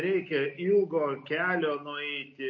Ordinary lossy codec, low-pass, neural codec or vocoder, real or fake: MP3, 32 kbps; 7.2 kHz; none; real